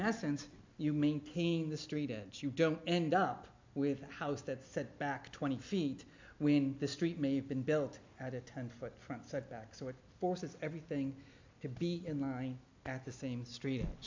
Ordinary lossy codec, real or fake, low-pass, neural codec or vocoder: MP3, 64 kbps; real; 7.2 kHz; none